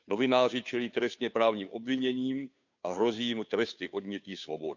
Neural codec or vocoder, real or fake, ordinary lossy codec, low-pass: codec, 16 kHz, 2 kbps, FunCodec, trained on Chinese and English, 25 frames a second; fake; none; 7.2 kHz